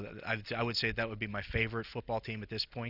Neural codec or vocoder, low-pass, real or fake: none; 5.4 kHz; real